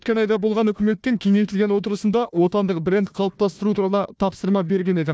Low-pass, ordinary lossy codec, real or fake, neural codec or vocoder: none; none; fake; codec, 16 kHz, 1 kbps, FunCodec, trained on Chinese and English, 50 frames a second